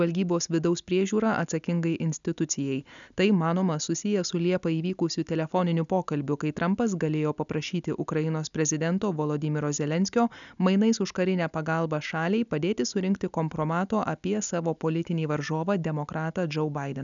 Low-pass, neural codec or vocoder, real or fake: 7.2 kHz; none; real